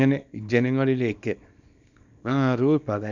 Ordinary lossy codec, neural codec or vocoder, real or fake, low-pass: none; codec, 24 kHz, 0.9 kbps, WavTokenizer, small release; fake; 7.2 kHz